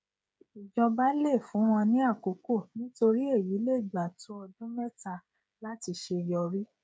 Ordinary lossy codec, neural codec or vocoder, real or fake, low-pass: none; codec, 16 kHz, 16 kbps, FreqCodec, smaller model; fake; none